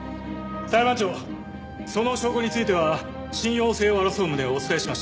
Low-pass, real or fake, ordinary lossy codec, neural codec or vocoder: none; real; none; none